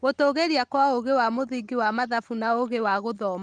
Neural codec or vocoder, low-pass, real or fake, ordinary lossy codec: none; 9.9 kHz; real; Opus, 24 kbps